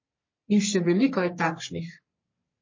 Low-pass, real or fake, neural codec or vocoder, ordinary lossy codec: 7.2 kHz; fake; codec, 44.1 kHz, 3.4 kbps, Pupu-Codec; MP3, 32 kbps